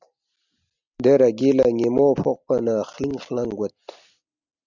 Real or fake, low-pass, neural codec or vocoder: real; 7.2 kHz; none